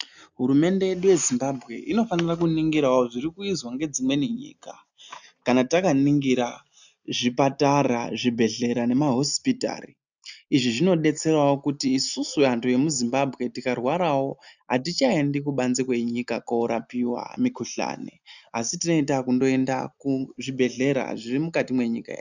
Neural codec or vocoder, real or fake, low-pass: none; real; 7.2 kHz